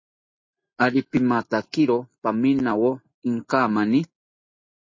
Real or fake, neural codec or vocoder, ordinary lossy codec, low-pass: real; none; MP3, 32 kbps; 7.2 kHz